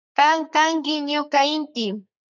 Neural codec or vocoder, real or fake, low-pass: codec, 32 kHz, 1.9 kbps, SNAC; fake; 7.2 kHz